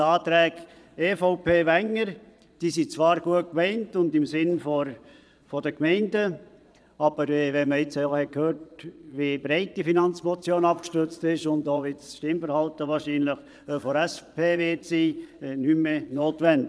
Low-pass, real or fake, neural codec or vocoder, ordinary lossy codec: none; fake; vocoder, 22.05 kHz, 80 mel bands, Vocos; none